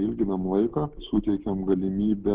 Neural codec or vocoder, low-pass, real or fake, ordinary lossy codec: none; 3.6 kHz; real; Opus, 16 kbps